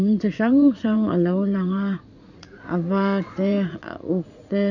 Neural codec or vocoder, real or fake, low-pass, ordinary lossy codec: vocoder, 44.1 kHz, 80 mel bands, Vocos; fake; 7.2 kHz; none